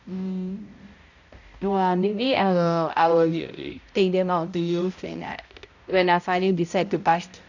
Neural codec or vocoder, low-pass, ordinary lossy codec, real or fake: codec, 16 kHz, 0.5 kbps, X-Codec, HuBERT features, trained on balanced general audio; 7.2 kHz; none; fake